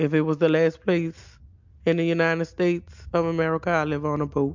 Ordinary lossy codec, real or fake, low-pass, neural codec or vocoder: MP3, 64 kbps; real; 7.2 kHz; none